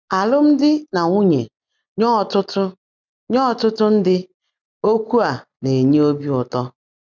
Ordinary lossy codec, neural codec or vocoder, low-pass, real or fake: none; none; 7.2 kHz; real